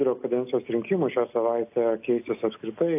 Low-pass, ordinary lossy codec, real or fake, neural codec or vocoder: 3.6 kHz; AAC, 32 kbps; real; none